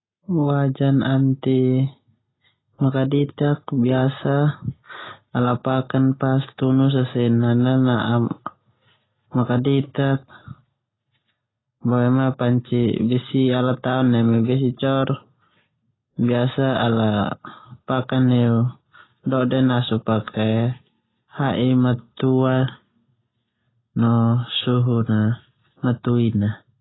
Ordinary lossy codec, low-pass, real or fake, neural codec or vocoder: AAC, 16 kbps; 7.2 kHz; real; none